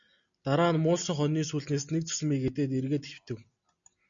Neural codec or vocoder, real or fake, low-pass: none; real; 7.2 kHz